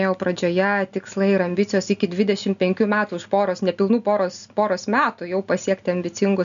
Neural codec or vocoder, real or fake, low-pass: none; real; 7.2 kHz